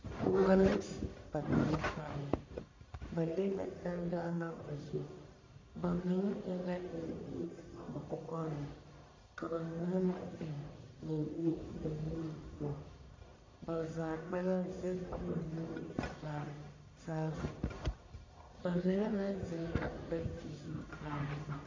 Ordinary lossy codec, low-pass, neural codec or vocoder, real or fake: MP3, 48 kbps; 7.2 kHz; codec, 44.1 kHz, 1.7 kbps, Pupu-Codec; fake